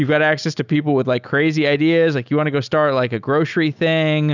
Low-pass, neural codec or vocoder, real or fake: 7.2 kHz; none; real